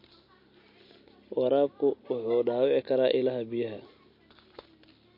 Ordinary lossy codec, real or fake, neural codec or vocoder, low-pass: MP3, 32 kbps; real; none; 5.4 kHz